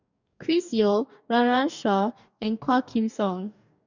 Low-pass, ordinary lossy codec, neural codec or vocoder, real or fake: 7.2 kHz; none; codec, 44.1 kHz, 2.6 kbps, DAC; fake